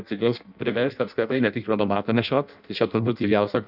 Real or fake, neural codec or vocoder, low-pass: fake; codec, 16 kHz in and 24 kHz out, 0.6 kbps, FireRedTTS-2 codec; 5.4 kHz